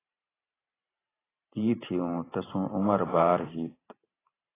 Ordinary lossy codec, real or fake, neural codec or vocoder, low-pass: AAC, 16 kbps; fake; vocoder, 44.1 kHz, 128 mel bands every 512 samples, BigVGAN v2; 3.6 kHz